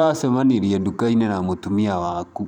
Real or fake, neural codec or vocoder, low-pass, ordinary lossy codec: fake; vocoder, 44.1 kHz, 128 mel bands every 512 samples, BigVGAN v2; 19.8 kHz; none